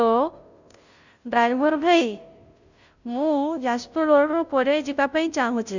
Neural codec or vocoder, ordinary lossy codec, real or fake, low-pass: codec, 16 kHz, 0.5 kbps, FunCodec, trained on Chinese and English, 25 frames a second; none; fake; 7.2 kHz